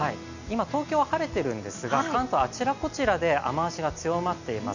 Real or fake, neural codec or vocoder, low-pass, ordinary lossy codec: real; none; 7.2 kHz; none